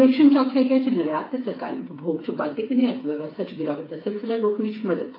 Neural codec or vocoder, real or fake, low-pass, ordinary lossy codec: codec, 16 kHz, 4 kbps, FreqCodec, smaller model; fake; 5.4 kHz; AAC, 24 kbps